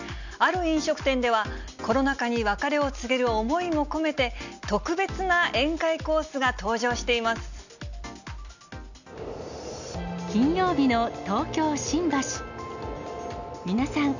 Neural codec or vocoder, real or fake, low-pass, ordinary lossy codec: none; real; 7.2 kHz; none